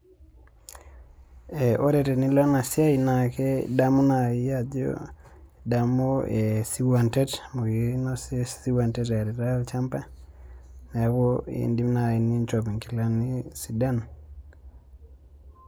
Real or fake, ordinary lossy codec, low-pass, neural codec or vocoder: real; none; none; none